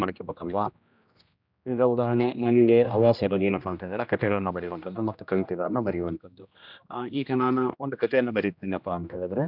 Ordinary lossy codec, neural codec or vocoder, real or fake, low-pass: none; codec, 16 kHz, 1 kbps, X-Codec, HuBERT features, trained on general audio; fake; 5.4 kHz